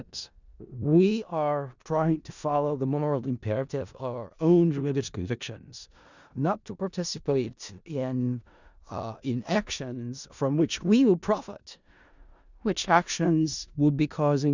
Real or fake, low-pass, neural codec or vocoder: fake; 7.2 kHz; codec, 16 kHz in and 24 kHz out, 0.4 kbps, LongCat-Audio-Codec, four codebook decoder